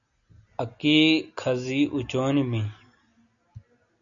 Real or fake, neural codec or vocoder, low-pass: real; none; 7.2 kHz